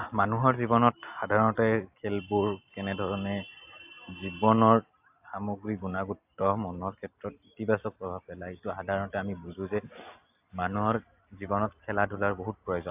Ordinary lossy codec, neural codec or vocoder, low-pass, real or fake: AAC, 32 kbps; none; 3.6 kHz; real